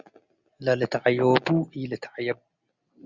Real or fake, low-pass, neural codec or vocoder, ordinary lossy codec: real; 7.2 kHz; none; Opus, 64 kbps